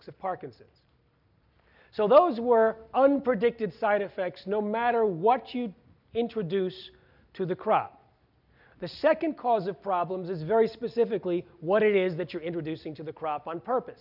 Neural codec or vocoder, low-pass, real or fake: none; 5.4 kHz; real